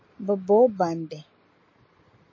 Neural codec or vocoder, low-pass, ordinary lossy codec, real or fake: none; 7.2 kHz; MP3, 32 kbps; real